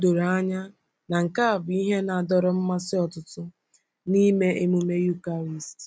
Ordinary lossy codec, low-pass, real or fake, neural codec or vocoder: none; none; real; none